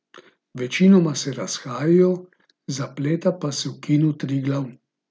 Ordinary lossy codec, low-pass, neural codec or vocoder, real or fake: none; none; none; real